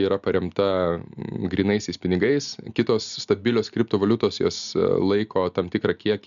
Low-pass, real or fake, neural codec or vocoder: 7.2 kHz; real; none